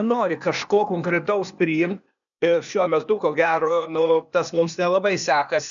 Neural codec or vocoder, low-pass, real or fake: codec, 16 kHz, 0.8 kbps, ZipCodec; 7.2 kHz; fake